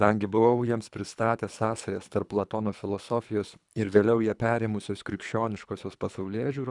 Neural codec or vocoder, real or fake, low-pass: codec, 24 kHz, 3 kbps, HILCodec; fake; 10.8 kHz